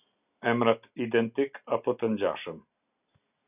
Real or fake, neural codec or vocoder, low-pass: real; none; 3.6 kHz